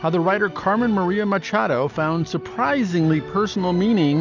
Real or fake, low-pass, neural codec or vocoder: real; 7.2 kHz; none